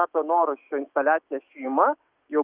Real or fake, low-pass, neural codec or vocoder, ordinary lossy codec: fake; 3.6 kHz; autoencoder, 48 kHz, 128 numbers a frame, DAC-VAE, trained on Japanese speech; Opus, 24 kbps